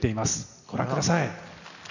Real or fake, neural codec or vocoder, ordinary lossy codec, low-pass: real; none; none; 7.2 kHz